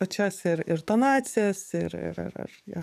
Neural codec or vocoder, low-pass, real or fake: codec, 44.1 kHz, 7.8 kbps, DAC; 14.4 kHz; fake